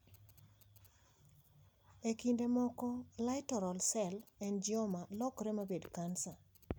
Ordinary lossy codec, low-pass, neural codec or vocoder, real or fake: none; none; none; real